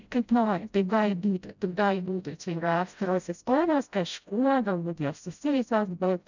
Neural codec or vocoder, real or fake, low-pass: codec, 16 kHz, 0.5 kbps, FreqCodec, smaller model; fake; 7.2 kHz